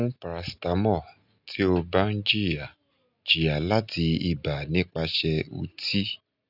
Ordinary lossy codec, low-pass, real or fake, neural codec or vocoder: none; 5.4 kHz; real; none